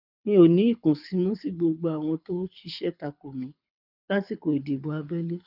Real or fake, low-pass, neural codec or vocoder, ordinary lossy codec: fake; 5.4 kHz; codec, 24 kHz, 6 kbps, HILCodec; none